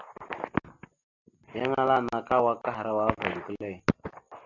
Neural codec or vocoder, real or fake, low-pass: none; real; 7.2 kHz